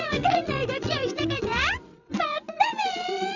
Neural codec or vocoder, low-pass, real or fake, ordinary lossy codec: vocoder, 22.05 kHz, 80 mel bands, WaveNeXt; 7.2 kHz; fake; none